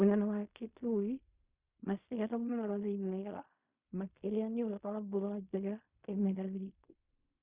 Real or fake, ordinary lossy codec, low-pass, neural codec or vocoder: fake; Opus, 64 kbps; 3.6 kHz; codec, 16 kHz in and 24 kHz out, 0.4 kbps, LongCat-Audio-Codec, fine tuned four codebook decoder